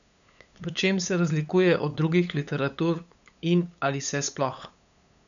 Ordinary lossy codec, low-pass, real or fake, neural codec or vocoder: none; 7.2 kHz; fake; codec, 16 kHz, 8 kbps, FunCodec, trained on LibriTTS, 25 frames a second